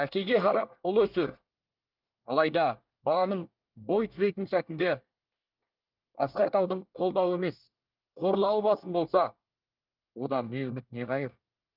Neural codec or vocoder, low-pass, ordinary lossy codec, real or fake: codec, 24 kHz, 1 kbps, SNAC; 5.4 kHz; Opus, 24 kbps; fake